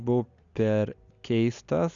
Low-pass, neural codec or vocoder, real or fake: 7.2 kHz; none; real